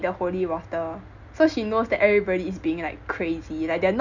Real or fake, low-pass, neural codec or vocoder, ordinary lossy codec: real; 7.2 kHz; none; none